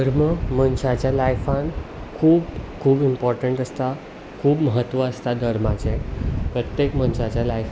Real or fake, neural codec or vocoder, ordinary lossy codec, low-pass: real; none; none; none